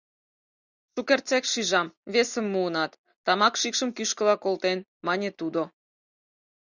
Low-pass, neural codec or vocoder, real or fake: 7.2 kHz; none; real